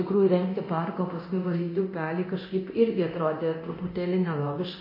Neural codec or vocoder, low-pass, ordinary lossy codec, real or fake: codec, 24 kHz, 0.9 kbps, DualCodec; 5.4 kHz; MP3, 24 kbps; fake